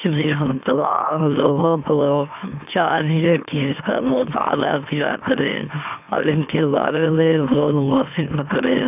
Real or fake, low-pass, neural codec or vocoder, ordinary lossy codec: fake; 3.6 kHz; autoencoder, 44.1 kHz, a latent of 192 numbers a frame, MeloTTS; none